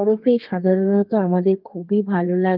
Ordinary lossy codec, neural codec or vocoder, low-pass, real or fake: Opus, 32 kbps; codec, 32 kHz, 1.9 kbps, SNAC; 5.4 kHz; fake